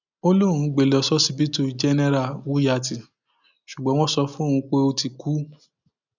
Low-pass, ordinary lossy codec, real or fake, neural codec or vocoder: 7.2 kHz; none; real; none